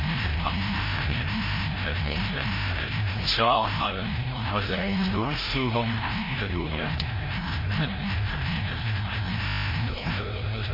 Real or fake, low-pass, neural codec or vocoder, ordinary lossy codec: fake; 5.4 kHz; codec, 16 kHz, 0.5 kbps, FreqCodec, larger model; MP3, 24 kbps